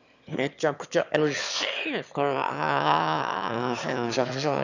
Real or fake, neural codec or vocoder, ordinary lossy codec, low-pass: fake; autoencoder, 22.05 kHz, a latent of 192 numbers a frame, VITS, trained on one speaker; none; 7.2 kHz